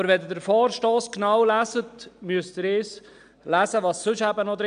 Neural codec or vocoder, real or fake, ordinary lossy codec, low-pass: none; real; none; 9.9 kHz